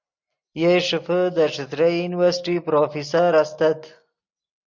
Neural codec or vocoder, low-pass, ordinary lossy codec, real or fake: none; 7.2 kHz; MP3, 48 kbps; real